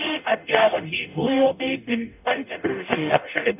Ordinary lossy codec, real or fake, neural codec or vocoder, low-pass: none; fake; codec, 44.1 kHz, 0.9 kbps, DAC; 3.6 kHz